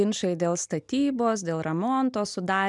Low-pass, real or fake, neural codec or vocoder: 10.8 kHz; real; none